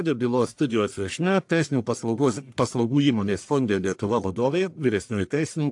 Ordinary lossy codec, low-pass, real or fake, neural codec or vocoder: AAC, 64 kbps; 10.8 kHz; fake; codec, 44.1 kHz, 1.7 kbps, Pupu-Codec